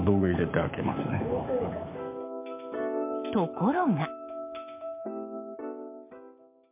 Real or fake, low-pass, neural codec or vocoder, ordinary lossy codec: fake; 3.6 kHz; codec, 16 kHz, 6 kbps, DAC; MP3, 24 kbps